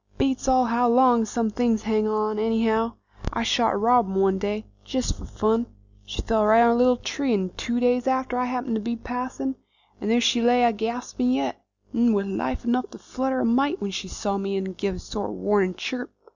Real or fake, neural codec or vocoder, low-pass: real; none; 7.2 kHz